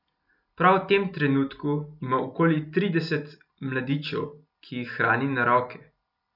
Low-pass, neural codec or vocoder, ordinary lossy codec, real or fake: 5.4 kHz; none; none; real